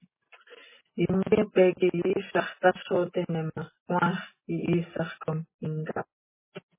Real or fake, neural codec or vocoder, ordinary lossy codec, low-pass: real; none; MP3, 16 kbps; 3.6 kHz